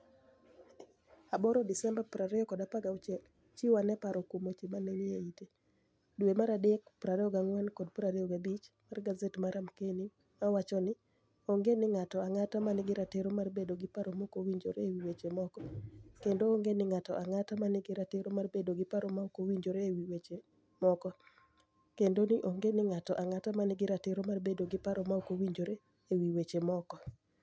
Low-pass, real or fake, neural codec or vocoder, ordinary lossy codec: none; real; none; none